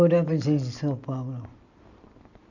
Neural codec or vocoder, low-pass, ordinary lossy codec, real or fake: none; 7.2 kHz; none; real